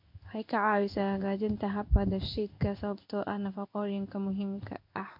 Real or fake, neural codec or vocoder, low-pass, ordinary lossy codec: fake; codec, 16 kHz in and 24 kHz out, 1 kbps, XY-Tokenizer; 5.4 kHz; MP3, 48 kbps